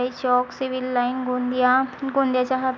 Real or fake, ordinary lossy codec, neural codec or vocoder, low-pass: real; none; none; none